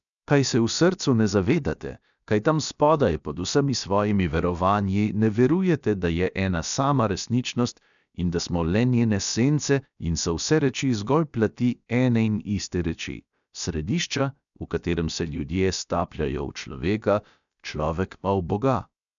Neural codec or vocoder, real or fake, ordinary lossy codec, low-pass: codec, 16 kHz, about 1 kbps, DyCAST, with the encoder's durations; fake; none; 7.2 kHz